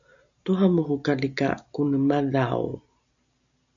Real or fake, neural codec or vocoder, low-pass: real; none; 7.2 kHz